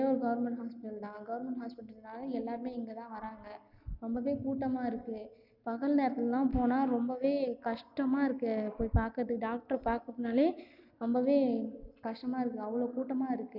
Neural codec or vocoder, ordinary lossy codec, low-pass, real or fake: none; none; 5.4 kHz; real